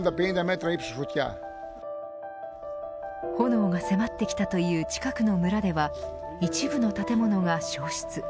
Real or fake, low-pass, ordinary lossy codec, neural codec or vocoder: real; none; none; none